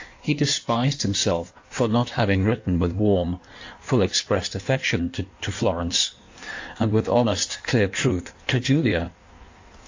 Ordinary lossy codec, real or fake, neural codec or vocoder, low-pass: AAC, 48 kbps; fake; codec, 16 kHz in and 24 kHz out, 1.1 kbps, FireRedTTS-2 codec; 7.2 kHz